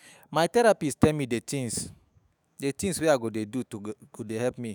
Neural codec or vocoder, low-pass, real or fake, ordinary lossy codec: autoencoder, 48 kHz, 128 numbers a frame, DAC-VAE, trained on Japanese speech; none; fake; none